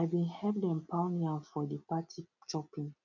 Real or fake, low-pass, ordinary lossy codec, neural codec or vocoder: real; 7.2 kHz; none; none